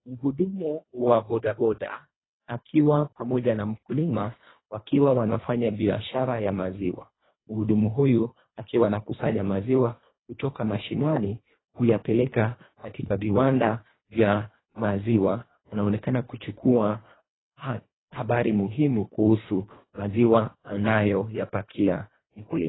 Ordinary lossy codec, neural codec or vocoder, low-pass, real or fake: AAC, 16 kbps; codec, 24 kHz, 1.5 kbps, HILCodec; 7.2 kHz; fake